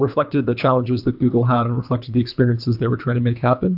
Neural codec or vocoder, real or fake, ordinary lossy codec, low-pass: codec, 24 kHz, 3 kbps, HILCodec; fake; AAC, 48 kbps; 5.4 kHz